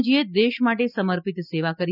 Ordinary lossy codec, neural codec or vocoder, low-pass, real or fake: none; none; 5.4 kHz; real